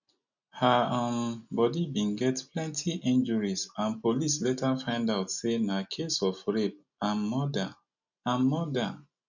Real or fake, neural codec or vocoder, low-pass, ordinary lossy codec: real; none; 7.2 kHz; none